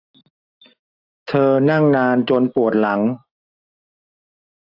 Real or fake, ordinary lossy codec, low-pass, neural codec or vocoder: real; AAC, 48 kbps; 5.4 kHz; none